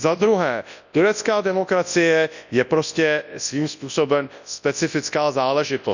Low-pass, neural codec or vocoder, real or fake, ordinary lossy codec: 7.2 kHz; codec, 24 kHz, 0.9 kbps, WavTokenizer, large speech release; fake; none